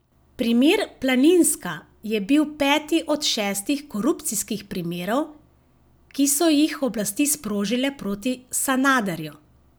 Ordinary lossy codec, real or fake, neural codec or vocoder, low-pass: none; real; none; none